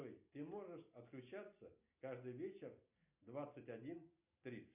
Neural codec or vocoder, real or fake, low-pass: none; real; 3.6 kHz